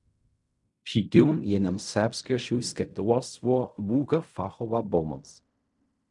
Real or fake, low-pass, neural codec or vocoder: fake; 10.8 kHz; codec, 16 kHz in and 24 kHz out, 0.4 kbps, LongCat-Audio-Codec, fine tuned four codebook decoder